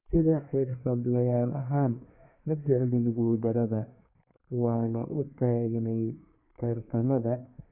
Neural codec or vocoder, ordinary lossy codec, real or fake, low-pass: codec, 24 kHz, 1 kbps, SNAC; none; fake; 3.6 kHz